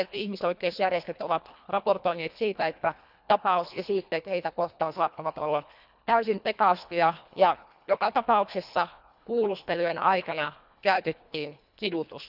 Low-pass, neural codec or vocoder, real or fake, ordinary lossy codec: 5.4 kHz; codec, 24 kHz, 1.5 kbps, HILCodec; fake; none